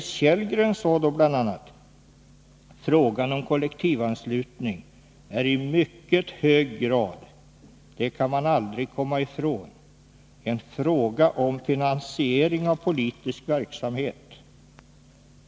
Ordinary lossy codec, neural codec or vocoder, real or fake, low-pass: none; none; real; none